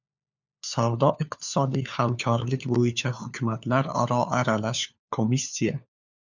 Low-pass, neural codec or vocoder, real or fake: 7.2 kHz; codec, 16 kHz, 4 kbps, FunCodec, trained on LibriTTS, 50 frames a second; fake